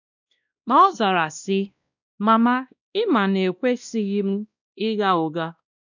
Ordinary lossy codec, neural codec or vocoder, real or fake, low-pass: none; codec, 16 kHz, 1 kbps, X-Codec, WavLM features, trained on Multilingual LibriSpeech; fake; 7.2 kHz